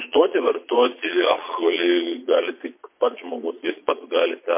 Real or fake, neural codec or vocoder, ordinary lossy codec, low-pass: fake; vocoder, 22.05 kHz, 80 mel bands, Vocos; MP3, 24 kbps; 3.6 kHz